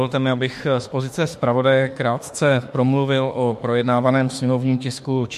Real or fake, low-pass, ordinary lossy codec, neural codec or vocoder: fake; 14.4 kHz; MP3, 64 kbps; autoencoder, 48 kHz, 32 numbers a frame, DAC-VAE, trained on Japanese speech